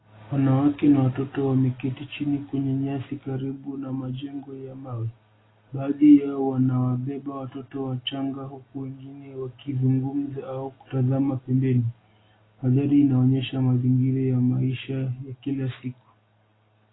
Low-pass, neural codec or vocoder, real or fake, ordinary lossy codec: 7.2 kHz; none; real; AAC, 16 kbps